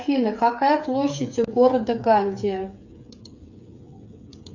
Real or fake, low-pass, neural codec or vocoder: fake; 7.2 kHz; codec, 16 kHz, 8 kbps, FreqCodec, smaller model